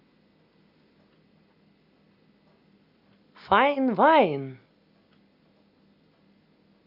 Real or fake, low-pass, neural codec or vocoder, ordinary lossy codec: real; 5.4 kHz; none; Opus, 64 kbps